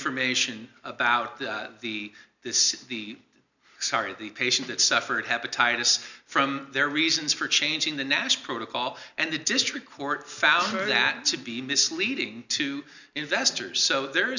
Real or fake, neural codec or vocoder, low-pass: real; none; 7.2 kHz